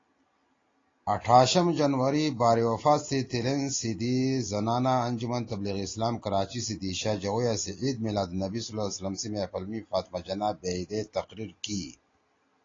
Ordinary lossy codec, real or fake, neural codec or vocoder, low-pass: AAC, 32 kbps; real; none; 7.2 kHz